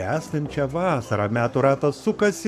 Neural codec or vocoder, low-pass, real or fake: codec, 44.1 kHz, 7.8 kbps, Pupu-Codec; 14.4 kHz; fake